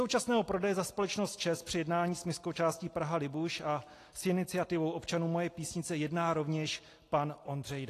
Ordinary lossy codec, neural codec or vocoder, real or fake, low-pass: AAC, 48 kbps; none; real; 14.4 kHz